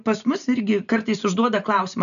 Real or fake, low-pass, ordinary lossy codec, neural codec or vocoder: real; 7.2 kHz; MP3, 96 kbps; none